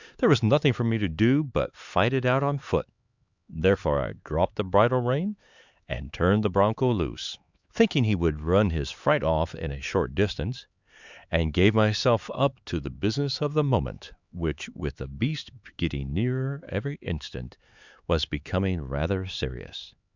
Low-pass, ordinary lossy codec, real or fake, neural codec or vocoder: 7.2 kHz; Opus, 64 kbps; fake; codec, 16 kHz, 4 kbps, X-Codec, HuBERT features, trained on LibriSpeech